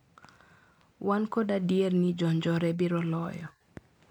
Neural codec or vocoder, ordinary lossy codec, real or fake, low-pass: none; MP3, 96 kbps; real; 19.8 kHz